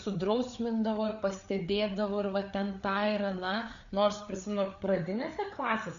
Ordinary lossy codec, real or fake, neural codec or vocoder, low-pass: AAC, 96 kbps; fake; codec, 16 kHz, 4 kbps, FreqCodec, larger model; 7.2 kHz